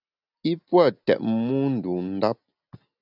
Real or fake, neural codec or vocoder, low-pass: real; none; 5.4 kHz